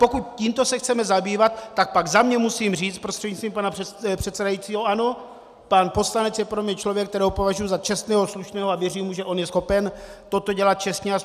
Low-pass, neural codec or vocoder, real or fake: 14.4 kHz; none; real